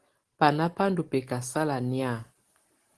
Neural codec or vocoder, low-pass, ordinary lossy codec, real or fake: none; 10.8 kHz; Opus, 16 kbps; real